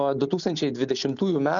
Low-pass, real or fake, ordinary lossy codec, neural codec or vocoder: 7.2 kHz; real; MP3, 96 kbps; none